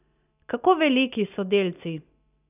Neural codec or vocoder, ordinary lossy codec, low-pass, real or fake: none; none; 3.6 kHz; real